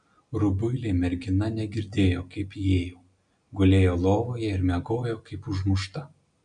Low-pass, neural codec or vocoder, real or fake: 9.9 kHz; none; real